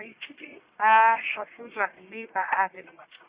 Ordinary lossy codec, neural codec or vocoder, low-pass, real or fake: Opus, 64 kbps; codec, 44.1 kHz, 1.7 kbps, Pupu-Codec; 3.6 kHz; fake